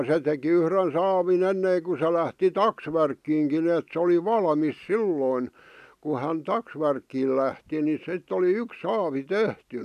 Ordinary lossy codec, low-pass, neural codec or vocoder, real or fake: none; 14.4 kHz; none; real